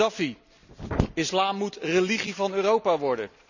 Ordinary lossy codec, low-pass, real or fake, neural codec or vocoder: none; 7.2 kHz; real; none